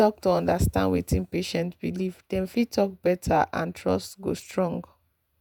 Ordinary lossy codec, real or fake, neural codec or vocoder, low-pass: none; fake; vocoder, 48 kHz, 128 mel bands, Vocos; none